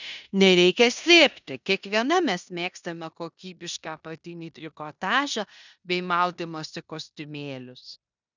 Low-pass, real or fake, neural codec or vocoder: 7.2 kHz; fake; codec, 16 kHz in and 24 kHz out, 0.9 kbps, LongCat-Audio-Codec, four codebook decoder